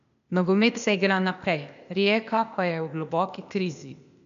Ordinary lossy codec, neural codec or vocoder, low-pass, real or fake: none; codec, 16 kHz, 0.8 kbps, ZipCodec; 7.2 kHz; fake